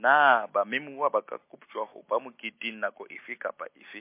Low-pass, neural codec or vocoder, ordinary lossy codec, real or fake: 3.6 kHz; none; MP3, 24 kbps; real